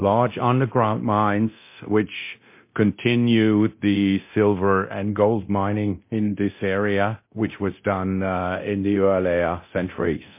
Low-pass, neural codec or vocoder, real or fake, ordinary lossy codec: 3.6 kHz; codec, 24 kHz, 0.9 kbps, DualCodec; fake; MP3, 24 kbps